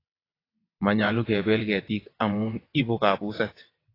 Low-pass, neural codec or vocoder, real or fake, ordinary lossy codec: 5.4 kHz; vocoder, 22.05 kHz, 80 mel bands, WaveNeXt; fake; AAC, 24 kbps